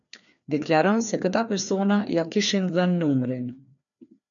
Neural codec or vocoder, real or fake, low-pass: codec, 16 kHz, 2 kbps, FreqCodec, larger model; fake; 7.2 kHz